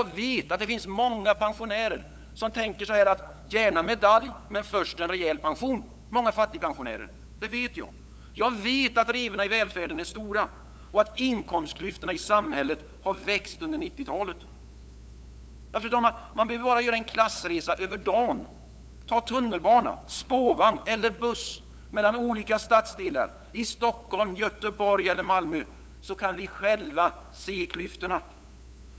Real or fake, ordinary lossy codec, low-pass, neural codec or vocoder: fake; none; none; codec, 16 kHz, 8 kbps, FunCodec, trained on LibriTTS, 25 frames a second